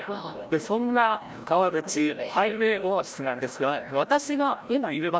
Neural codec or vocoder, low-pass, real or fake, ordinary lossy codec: codec, 16 kHz, 0.5 kbps, FreqCodec, larger model; none; fake; none